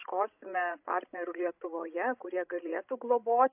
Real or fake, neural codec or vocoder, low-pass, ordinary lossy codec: fake; codec, 16 kHz, 16 kbps, FreqCodec, larger model; 3.6 kHz; AAC, 32 kbps